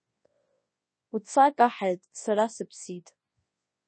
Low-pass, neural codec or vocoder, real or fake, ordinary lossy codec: 9.9 kHz; codec, 24 kHz, 0.9 kbps, WavTokenizer, large speech release; fake; MP3, 32 kbps